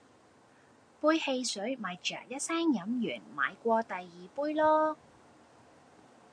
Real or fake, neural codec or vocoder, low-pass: real; none; 9.9 kHz